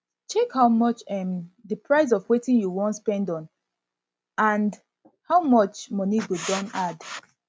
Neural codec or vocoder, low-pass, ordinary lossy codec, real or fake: none; none; none; real